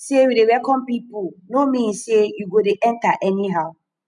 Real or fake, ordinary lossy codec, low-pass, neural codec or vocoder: real; none; 10.8 kHz; none